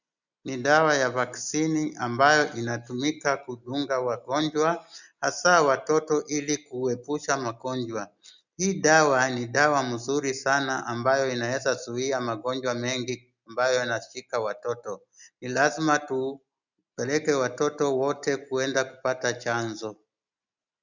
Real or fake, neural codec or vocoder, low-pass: real; none; 7.2 kHz